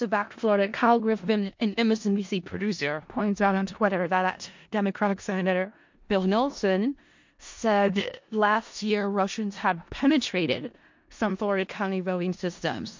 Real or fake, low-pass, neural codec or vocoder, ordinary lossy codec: fake; 7.2 kHz; codec, 16 kHz in and 24 kHz out, 0.4 kbps, LongCat-Audio-Codec, four codebook decoder; MP3, 48 kbps